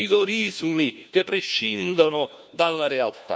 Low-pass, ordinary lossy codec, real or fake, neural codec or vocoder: none; none; fake; codec, 16 kHz, 1 kbps, FunCodec, trained on LibriTTS, 50 frames a second